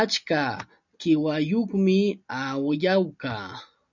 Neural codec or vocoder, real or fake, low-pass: none; real; 7.2 kHz